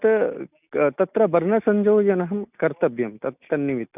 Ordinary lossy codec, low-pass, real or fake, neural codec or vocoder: none; 3.6 kHz; real; none